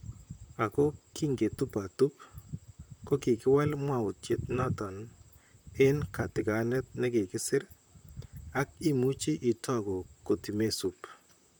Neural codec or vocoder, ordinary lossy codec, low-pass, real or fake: vocoder, 44.1 kHz, 128 mel bands, Pupu-Vocoder; none; none; fake